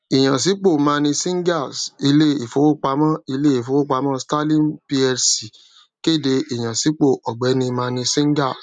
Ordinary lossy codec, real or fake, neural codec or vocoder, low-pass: none; real; none; 9.9 kHz